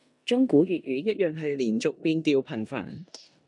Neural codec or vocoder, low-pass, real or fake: codec, 16 kHz in and 24 kHz out, 0.9 kbps, LongCat-Audio-Codec, four codebook decoder; 10.8 kHz; fake